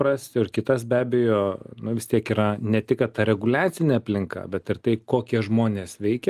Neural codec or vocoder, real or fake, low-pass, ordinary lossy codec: none; real; 14.4 kHz; Opus, 32 kbps